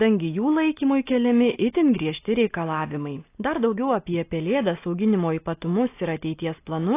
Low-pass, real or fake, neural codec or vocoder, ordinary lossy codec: 3.6 kHz; real; none; AAC, 24 kbps